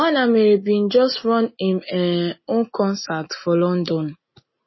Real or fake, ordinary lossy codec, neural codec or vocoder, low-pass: real; MP3, 24 kbps; none; 7.2 kHz